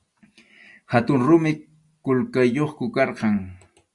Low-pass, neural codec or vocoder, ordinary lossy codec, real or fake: 10.8 kHz; none; Opus, 64 kbps; real